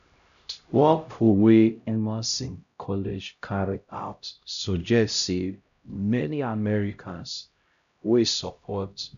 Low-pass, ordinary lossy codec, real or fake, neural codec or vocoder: 7.2 kHz; none; fake; codec, 16 kHz, 0.5 kbps, X-Codec, HuBERT features, trained on LibriSpeech